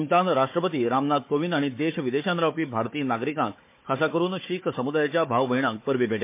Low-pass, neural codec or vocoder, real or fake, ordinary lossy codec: 3.6 kHz; codec, 16 kHz, 4 kbps, FunCodec, trained on Chinese and English, 50 frames a second; fake; MP3, 24 kbps